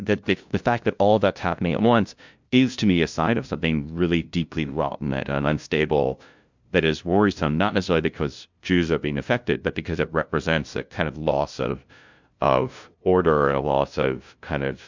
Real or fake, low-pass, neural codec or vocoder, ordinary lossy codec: fake; 7.2 kHz; codec, 16 kHz, 0.5 kbps, FunCodec, trained on LibriTTS, 25 frames a second; MP3, 64 kbps